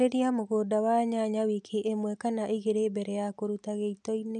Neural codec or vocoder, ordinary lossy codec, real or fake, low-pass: none; none; real; 9.9 kHz